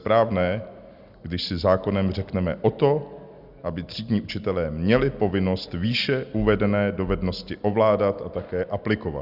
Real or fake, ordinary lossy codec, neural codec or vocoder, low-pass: real; Opus, 64 kbps; none; 5.4 kHz